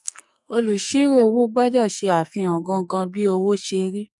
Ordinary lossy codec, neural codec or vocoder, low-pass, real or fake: none; codec, 44.1 kHz, 2.6 kbps, SNAC; 10.8 kHz; fake